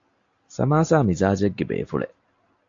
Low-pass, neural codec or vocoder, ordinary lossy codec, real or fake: 7.2 kHz; none; AAC, 48 kbps; real